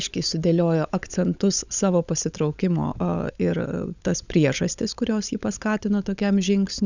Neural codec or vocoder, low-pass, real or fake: codec, 16 kHz, 16 kbps, FunCodec, trained on LibriTTS, 50 frames a second; 7.2 kHz; fake